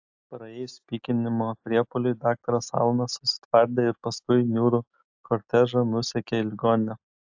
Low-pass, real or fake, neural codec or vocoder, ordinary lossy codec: 7.2 kHz; real; none; AAC, 48 kbps